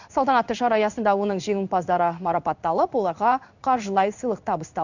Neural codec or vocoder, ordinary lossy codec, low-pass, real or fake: codec, 16 kHz in and 24 kHz out, 1 kbps, XY-Tokenizer; none; 7.2 kHz; fake